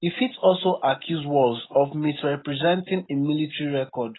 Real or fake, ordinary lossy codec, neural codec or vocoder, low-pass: real; AAC, 16 kbps; none; 7.2 kHz